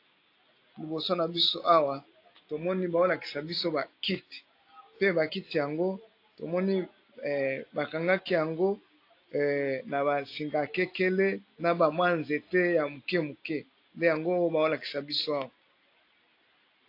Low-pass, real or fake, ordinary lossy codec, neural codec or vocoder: 5.4 kHz; real; AAC, 32 kbps; none